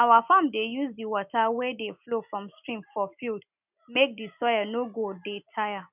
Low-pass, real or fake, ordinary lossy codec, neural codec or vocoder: 3.6 kHz; real; none; none